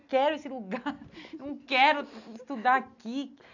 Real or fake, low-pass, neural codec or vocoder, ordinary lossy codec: real; 7.2 kHz; none; none